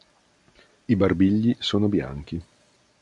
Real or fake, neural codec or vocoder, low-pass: real; none; 10.8 kHz